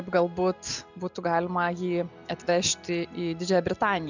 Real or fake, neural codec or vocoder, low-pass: real; none; 7.2 kHz